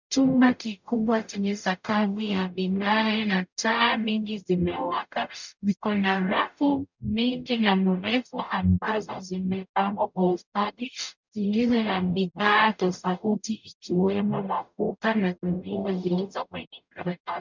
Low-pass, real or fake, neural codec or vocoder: 7.2 kHz; fake; codec, 44.1 kHz, 0.9 kbps, DAC